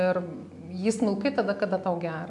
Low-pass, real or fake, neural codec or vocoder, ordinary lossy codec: 10.8 kHz; real; none; AAC, 64 kbps